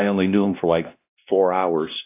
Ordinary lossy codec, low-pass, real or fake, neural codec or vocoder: AAC, 24 kbps; 3.6 kHz; fake; codec, 16 kHz, 1 kbps, X-Codec, WavLM features, trained on Multilingual LibriSpeech